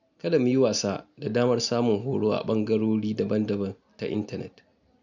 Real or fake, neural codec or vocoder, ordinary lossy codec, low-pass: real; none; none; 7.2 kHz